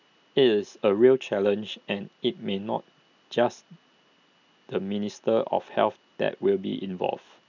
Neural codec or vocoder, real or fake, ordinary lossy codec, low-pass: none; real; none; 7.2 kHz